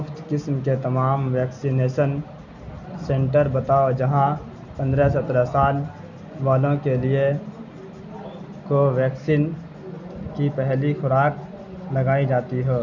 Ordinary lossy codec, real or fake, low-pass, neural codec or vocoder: none; real; 7.2 kHz; none